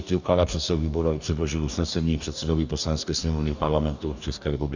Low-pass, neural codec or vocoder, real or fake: 7.2 kHz; codec, 44.1 kHz, 2.6 kbps, DAC; fake